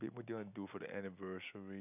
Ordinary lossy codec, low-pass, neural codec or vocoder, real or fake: none; 3.6 kHz; none; real